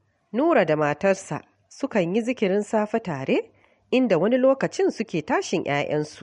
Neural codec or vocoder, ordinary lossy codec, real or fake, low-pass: none; MP3, 48 kbps; real; 14.4 kHz